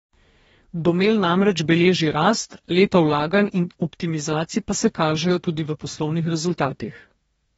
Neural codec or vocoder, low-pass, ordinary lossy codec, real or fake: codec, 44.1 kHz, 2.6 kbps, DAC; 19.8 kHz; AAC, 24 kbps; fake